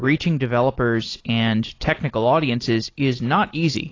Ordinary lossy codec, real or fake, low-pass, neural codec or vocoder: AAC, 32 kbps; real; 7.2 kHz; none